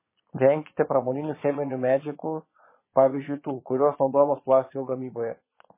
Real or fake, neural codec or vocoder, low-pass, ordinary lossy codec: fake; vocoder, 22.05 kHz, 80 mel bands, Vocos; 3.6 kHz; MP3, 16 kbps